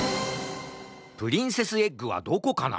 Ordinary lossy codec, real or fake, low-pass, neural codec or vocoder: none; real; none; none